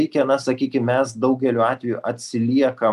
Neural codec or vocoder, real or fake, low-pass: none; real; 14.4 kHz